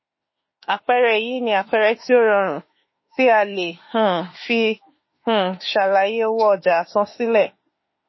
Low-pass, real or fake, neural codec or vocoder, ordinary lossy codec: 7.2 kHz; fake; autoencoder, 48 kHz, 32 numbers a frame, DAC-VAE, trained on Japanese speech; MP3, 24 kbps